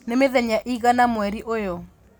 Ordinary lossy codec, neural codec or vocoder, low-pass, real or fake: none; none; none; real